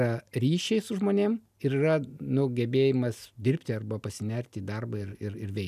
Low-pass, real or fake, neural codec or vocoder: 14.4 kHz; real; none